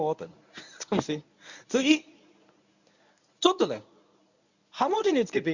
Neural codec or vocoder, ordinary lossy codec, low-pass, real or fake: codec, 24 kHz, 0.9 kbps, WavTokenizer, medium speech release version 1; none; 7.2 kHz; fake